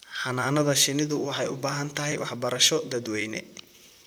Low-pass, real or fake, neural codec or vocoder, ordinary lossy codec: none; fake; vocoder, 44.1 kHz, 128 mel bands, Pupu-Vocoder; none